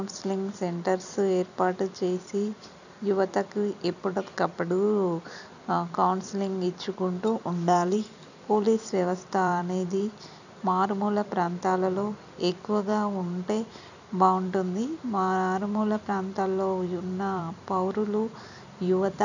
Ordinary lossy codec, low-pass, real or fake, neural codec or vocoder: none; 7.2 kHz; fake; vocoder, 44.1 kHz, 128 mel bands every 256 samples, BigVGAN v2